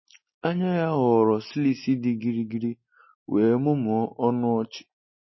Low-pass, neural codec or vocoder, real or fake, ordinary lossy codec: 7.2 kHz; none; real; MP3, 24 kbps